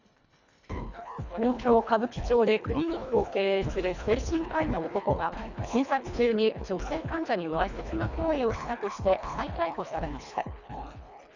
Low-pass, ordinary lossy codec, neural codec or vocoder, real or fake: 7.2 kHz; none; codec, 24 kHz, 1.5 kbps, HILCodec; fake